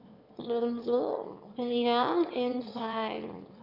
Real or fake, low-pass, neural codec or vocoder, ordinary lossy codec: fake; 5.4 kHz; autoencoder, 22.05 kHz, a latent of 192 numbers a frame, VITS, trained on one speaker; Opus, 64 kbps